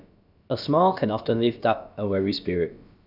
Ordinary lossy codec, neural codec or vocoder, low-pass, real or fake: none; codec, 16 kHz, about 1 kbps, DyCAST, with the encoder's durations; 5.4 kHz; fake